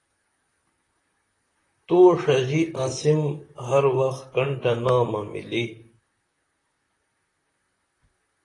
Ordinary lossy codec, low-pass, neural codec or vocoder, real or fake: AAC, 32 kbps; 10.8 kHz; vocoder, 44.1 kHz, 128 mel bands, Pupu-Vocoder; fake